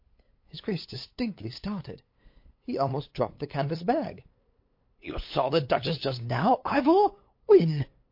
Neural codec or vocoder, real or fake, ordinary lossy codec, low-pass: codec, 16 kHz, 8 kbps, FunCodec, trained on LibriTTS, 25 frames a second; fake; MP3, 32 kbps; 5.4 kHz